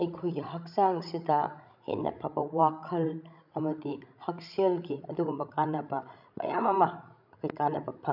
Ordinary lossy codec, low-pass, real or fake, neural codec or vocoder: none; 5.4 kHz; fake; codec, 16 kHz, 16 kbps, FreqCodec, larger model